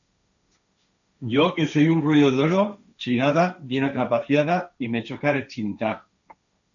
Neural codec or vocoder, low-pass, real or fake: codec, 16 kHz, 1.1 kbps, Voila-Tokenizer; 7.2 kHz; fake